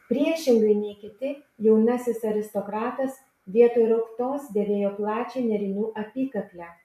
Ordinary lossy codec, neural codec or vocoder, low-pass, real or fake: MP3, 64 kbps; none; 14.4 kHz; real